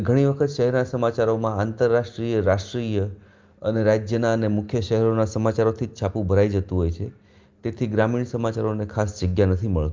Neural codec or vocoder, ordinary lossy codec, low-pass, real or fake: none; Opus, 32 kbps; 7.2 kHz; real